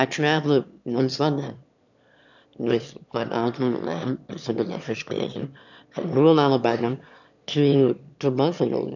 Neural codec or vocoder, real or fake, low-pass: autoencoder, 22.05 kHz, a latent of 192 numbers a frame, VITS, trained on one speaker; fake; 7.2 kHz